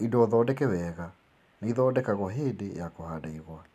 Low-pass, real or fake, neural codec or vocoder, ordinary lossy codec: 14.4 kHz; real; none; none